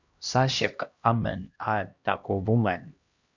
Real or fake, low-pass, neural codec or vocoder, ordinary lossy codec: fake; 7.2 kHz; codec, 16 kHz, 1 kbps, X-Codec, HuBERT features, trained on LibriSpeech; Opus, 64 kbps